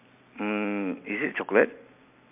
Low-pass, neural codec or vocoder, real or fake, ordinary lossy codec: 3.6 kHz; none; real; MP3, 32 kbps